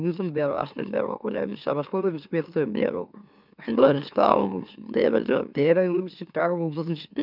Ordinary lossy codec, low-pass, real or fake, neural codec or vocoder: none; 5.4 kHz; fake; autoencoder, 44.1 kHz, a latent of 192 numbers a frame, MeloTTS